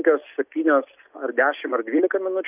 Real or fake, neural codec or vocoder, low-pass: real; none; 3.6 kHz